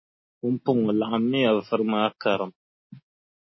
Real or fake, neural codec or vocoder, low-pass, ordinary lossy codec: real; none; 7.2 kHz; MP3, 24 kbps